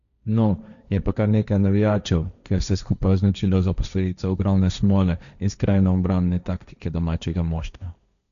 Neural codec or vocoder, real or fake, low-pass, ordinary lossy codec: codec, 16 kHz, 1.1 kbps, Voila-Tokenizer; fake; 7.2 kHz; none